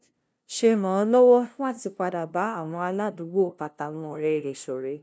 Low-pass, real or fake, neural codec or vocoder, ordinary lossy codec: none; fake; codec, 16 kHz, 0.5 kbps, FunCodec, trained on LibriTTS, 25 frames a second; none